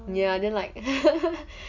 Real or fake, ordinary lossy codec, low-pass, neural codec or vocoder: real; none; 7.2 kHz; none